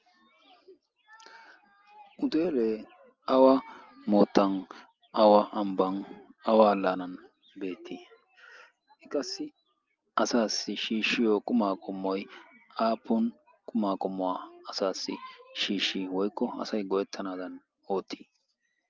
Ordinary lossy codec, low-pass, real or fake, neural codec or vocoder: Opus, 24 kbps; 7.2 kHz; real; none